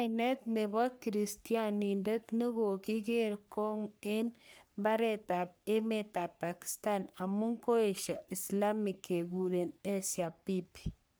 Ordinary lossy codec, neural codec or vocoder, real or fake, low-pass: none; codec, 44.1 kHz, 3.4 kbps, Pupu-Codec; fake; none